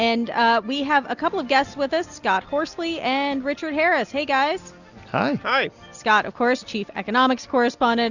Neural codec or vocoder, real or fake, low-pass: none; real; 7.2 kHz